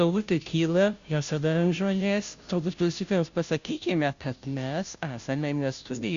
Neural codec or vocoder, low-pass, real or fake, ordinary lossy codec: codec, 16 kHz, 0.5 kbps, FunCodec, trained on Chinese and English, 25 frames a second; 7.2 kHz; fake; Opus, 64 kbps